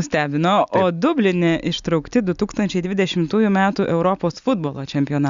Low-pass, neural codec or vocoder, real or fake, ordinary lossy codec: 7.2 kHz; none; real; Opus, 64 kbps